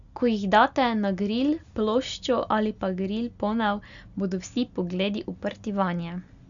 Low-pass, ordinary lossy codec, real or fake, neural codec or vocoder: 7.2 kHz; none; real; none